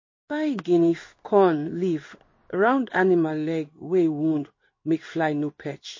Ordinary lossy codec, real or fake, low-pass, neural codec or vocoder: MP3, 32 kbps; fake; 7.2 kHz; codec, 16 kHz in and 24 kHz out, 1 kbps, XY-Tokenizer